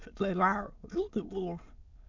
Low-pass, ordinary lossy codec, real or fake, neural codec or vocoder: 7.2 kHz; MP3, 64 kbps; fake; autoencoder, 22.05 kHz, a latent of 192 numbers a frame, VITS, trained on many speakers